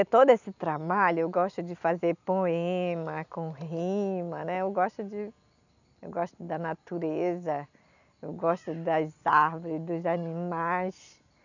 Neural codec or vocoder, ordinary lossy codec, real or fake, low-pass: none; none; real; 7.2 kHz